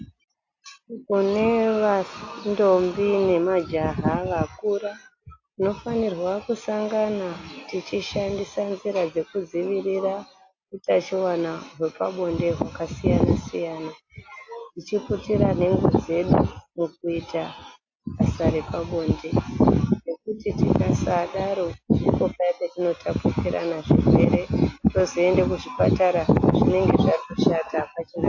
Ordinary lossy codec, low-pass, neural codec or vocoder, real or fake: AAC, 48 kbps; 7.2 kHz; none; real